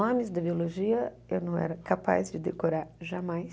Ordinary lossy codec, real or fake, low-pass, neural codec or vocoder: none; real; none; none